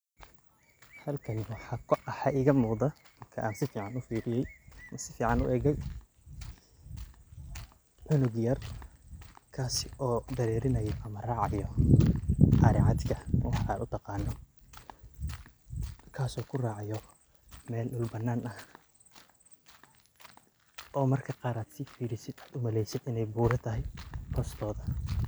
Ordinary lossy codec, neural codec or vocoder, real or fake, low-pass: none; none; real; none